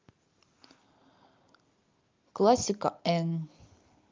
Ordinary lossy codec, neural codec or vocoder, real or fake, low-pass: Opus, 24 kbps; none; real; 7.2 kHz